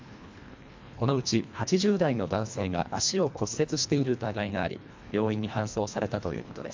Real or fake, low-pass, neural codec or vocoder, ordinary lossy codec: fake; 7.2 kHz; codec, 24 kHz, 1.5 kbps, HILCodec; MP3, 64 kbps